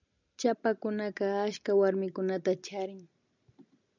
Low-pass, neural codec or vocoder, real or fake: 7.2 kHz; none; real